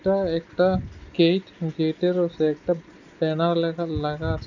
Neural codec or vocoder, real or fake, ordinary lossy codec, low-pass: none; real; none; 7.2 kHz